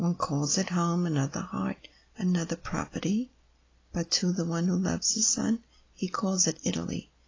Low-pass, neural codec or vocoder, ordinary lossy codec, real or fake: 7.2 kHz; none; AAC, 32 kbps; real